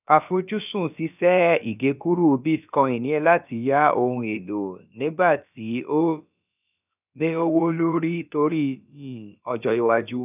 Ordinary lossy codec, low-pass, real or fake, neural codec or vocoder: none; 3.6 kHz; fake; codec, 16 kHz, about 1 kbps, DyCAST, with the encoder's durations